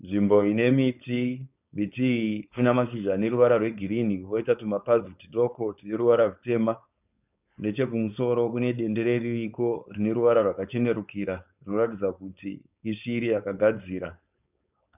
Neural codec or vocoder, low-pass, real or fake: codec, 16 kHz, 4.8 kbps, FACodec; 3.6 kHz; fake